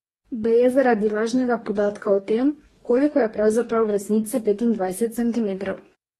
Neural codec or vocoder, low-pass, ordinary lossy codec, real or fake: codec, 44.1 kHz, 2.6 kbps, DAC; 19.8 kHz; AAC, 32 kbps; fake